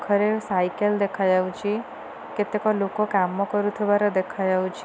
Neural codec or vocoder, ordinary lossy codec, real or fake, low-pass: none; none; real; none